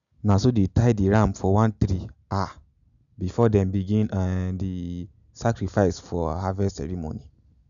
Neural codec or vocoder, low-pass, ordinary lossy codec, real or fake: none; 7.2 kHz; none; real